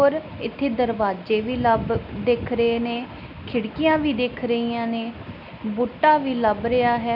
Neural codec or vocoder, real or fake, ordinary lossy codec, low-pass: none; real; none; 5.4 kHz